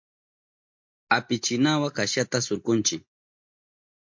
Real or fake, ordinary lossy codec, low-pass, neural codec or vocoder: real; MP3, 48 kbps; 7.2 kHz; none